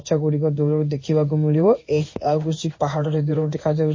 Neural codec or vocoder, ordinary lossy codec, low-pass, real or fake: codec, 16 kHz in and 24 kHz out, 1 kbps, XY-Tokenizer; MP3, 32 kbps; 7.2 kHz; fake